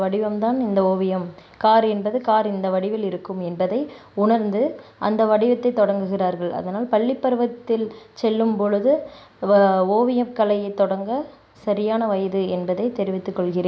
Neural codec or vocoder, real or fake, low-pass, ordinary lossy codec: none; real; none; none